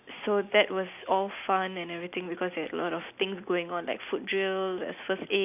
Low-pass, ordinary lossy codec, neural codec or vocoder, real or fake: 3.6 kHz; none; none; real